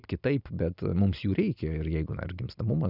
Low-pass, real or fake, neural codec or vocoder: 5.4 kHz; real; none